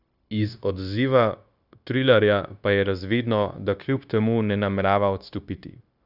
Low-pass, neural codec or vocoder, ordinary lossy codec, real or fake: 5.4 kHz; codec, 16 kHz, 0.9 kbps, LongCat-Audio-Codec; none; fake